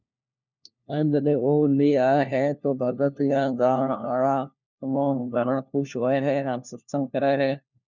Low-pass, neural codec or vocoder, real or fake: 7.2 kHz; codec, 16 kHz, 1 kbps, FunCodec, trained on LibriTTS, 50 frames a second; fake